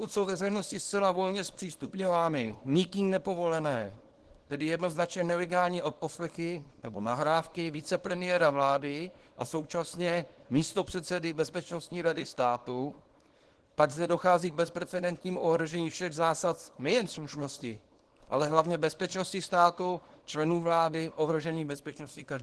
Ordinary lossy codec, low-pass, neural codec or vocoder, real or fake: Opus, 16 kbps; 10.8 kHz; codec, 24 kHz, 0.9 kbps, WavTokenizer, small release; fake